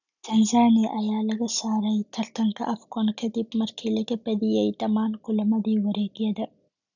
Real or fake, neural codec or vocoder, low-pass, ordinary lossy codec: real; none; 7.2 kHz; none